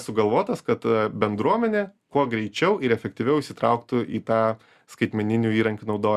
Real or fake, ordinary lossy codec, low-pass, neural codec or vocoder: real; Opus, 64 kbps; 14.4 kHz; none